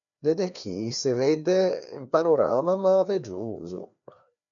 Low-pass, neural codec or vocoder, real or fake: 7.2 kHz; codec, 16 kHz, 2 kbps, FreqCodec, larger model; fake